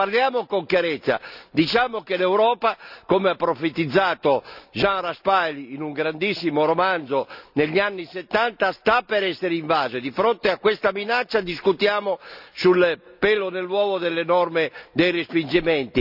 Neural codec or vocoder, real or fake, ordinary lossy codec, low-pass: none; real; none; 5.4 kHz